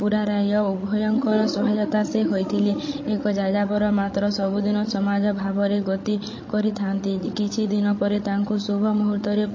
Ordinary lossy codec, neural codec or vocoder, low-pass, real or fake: MP3, 32 kbps; codec, 16 kHz, 16 kbps, FreqCodec, larger model; 7.2 kHz; fake